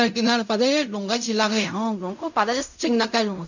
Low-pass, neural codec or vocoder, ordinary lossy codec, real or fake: 7.2 kHz; codec, 16 kHz in and 24 kHz out, 0.4 kbps, LongCat-Audio-Codec, fine tuned four codebook decoder; none; fake